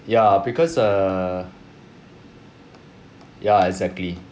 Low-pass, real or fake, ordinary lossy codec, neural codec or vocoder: none; real; none; none